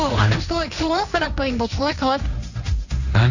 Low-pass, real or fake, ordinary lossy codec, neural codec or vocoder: 7.2 kHz; fake; none; codec, 16 kHz, 1.1 kbps, Voila-Tokenizer